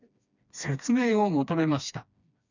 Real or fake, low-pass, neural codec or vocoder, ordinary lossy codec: fake; 7.2 kHz; codec, 16 kHz, 2 kbps, FreqCodec, smaller model; none